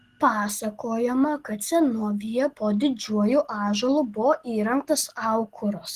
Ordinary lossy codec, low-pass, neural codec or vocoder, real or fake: Opus, 16 kbps; 14.4 kHz; none; real